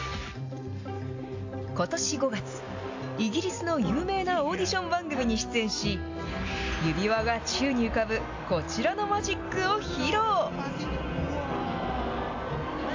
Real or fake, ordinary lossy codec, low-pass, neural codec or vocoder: real; AAC, 48 kbps; 7.2 kHz; none